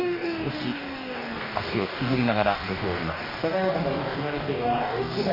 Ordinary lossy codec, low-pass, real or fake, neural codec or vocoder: none; 5.4 kHz; fake; codec, 44.1 kHz, 2.6 kbps, DAC